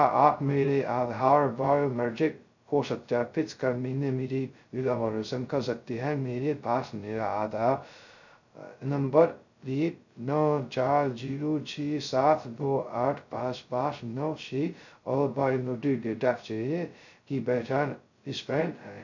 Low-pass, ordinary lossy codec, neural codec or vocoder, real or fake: 7.2 kHz; none; codec, 16 kHz, 0.2 kbps, FocalCodec; fake